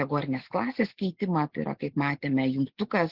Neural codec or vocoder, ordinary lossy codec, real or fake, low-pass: none; Opus, 16 kbps; real; 5.4 kHz